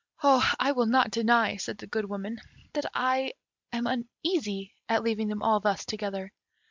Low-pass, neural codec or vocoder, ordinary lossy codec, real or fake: 7.2 kHz; none; MP3, 64 kbps; real